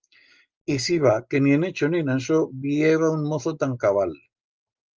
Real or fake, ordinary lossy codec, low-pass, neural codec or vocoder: real; Opus, 24 kbps; 7.2 kHz; none